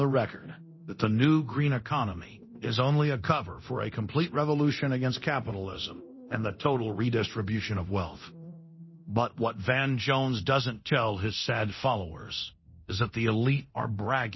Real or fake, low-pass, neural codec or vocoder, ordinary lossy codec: fake; 7.2 kHz; codec, 24 kHz, 0.9 kbps, DualCodec; MP3, 24 kbps